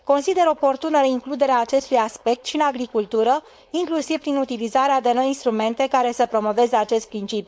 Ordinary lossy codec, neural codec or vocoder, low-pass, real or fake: none; codec, 16 kHz, 4.8 kbps, FACodec; none; fake